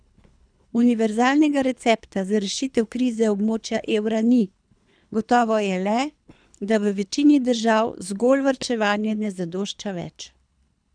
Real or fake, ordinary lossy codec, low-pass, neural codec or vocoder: fake; none; 9.9 kHz; codec, 24 kHz, 3 kbps, HILCodec